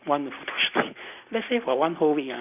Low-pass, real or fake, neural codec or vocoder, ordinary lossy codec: 3.6 kHz; real; none; none